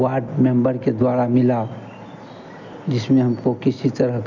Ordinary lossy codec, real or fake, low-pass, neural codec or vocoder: none; real; 7.2 kHz; none